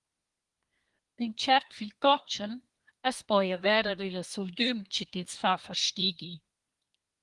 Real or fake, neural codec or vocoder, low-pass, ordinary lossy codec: fake; codec, 24 kHz, 1 kbps, SNAC; 10.8 kHz; Opus, 24 kbps